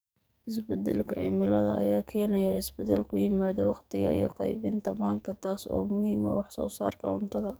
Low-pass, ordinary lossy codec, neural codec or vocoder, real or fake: none; none; codec, 44.1 kHz, 2.6 kbps, SNAC; fake